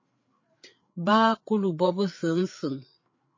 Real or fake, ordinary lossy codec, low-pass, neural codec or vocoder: fake; MP3, 32 kbps; 7.2 kHz; codec, 16 kHz, 4 kbps, FreqCodec, larger model